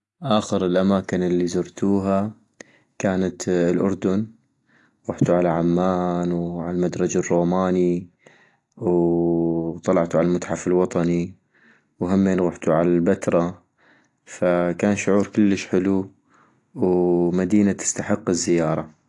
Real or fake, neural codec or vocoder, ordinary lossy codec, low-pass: real; none; AAC, 48 kbps; 10.8 kHz